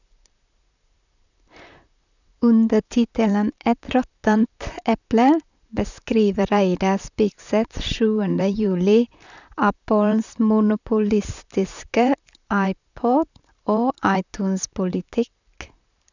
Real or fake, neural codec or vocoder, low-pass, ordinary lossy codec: fake; vocoder, 44.1 kHz, 128 mel bands, Pupu-Vocoder; 7.2 kHz; none